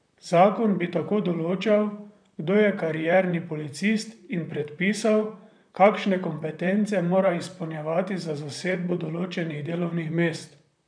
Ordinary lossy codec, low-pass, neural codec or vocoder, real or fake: none; 9.9 kHz; vocoder, 44.1 kHz, 128 mel bands, Pupu-Vocoder; fake